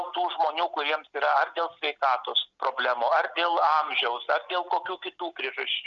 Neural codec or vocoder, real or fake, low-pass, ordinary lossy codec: none; real; 7.2 kHz; Opus, 64 kbps